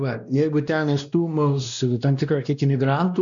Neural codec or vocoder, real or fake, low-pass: codec, 16 kHz, 1 kbps, X-Codec, WavLM features, trained on Multilingual LibriSpeech; fake; 7.2 kHz